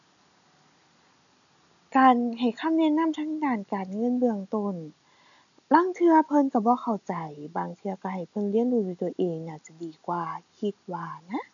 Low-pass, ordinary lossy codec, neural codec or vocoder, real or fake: 7.2 kHz; none; none; real